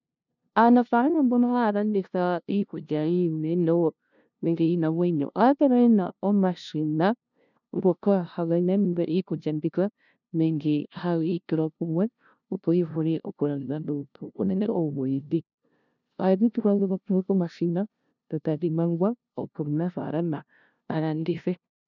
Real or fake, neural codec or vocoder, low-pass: fake; codec, 16 kHz, 0.5 kbps, FunCodec, trained on LibriTTS, 25 frames a second; 7.2 kHz